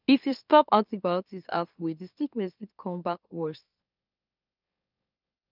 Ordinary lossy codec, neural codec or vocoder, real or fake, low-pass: none; autoencoder, 44.1 kHz, a latent of 192 numbers a frame, MeloTTS; fake; 5.4 kHz